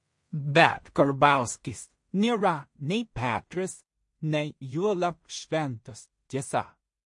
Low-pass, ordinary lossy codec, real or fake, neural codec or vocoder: 10.8 kHz; MP3, 48 kbps; fake; codec, 16 kHz in and 24 kHz out, 0.4 kbps, LongCat-Audio-Codec, two codebook decoder